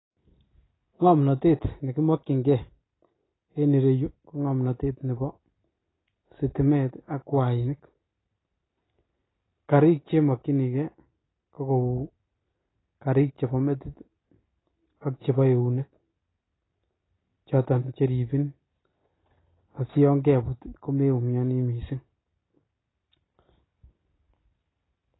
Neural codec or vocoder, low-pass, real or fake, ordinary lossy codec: none; 7.2 kHz; real; AAC, 16 kbps